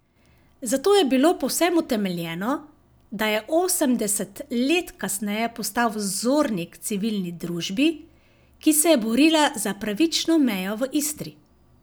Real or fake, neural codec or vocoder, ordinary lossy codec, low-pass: real; none; none; none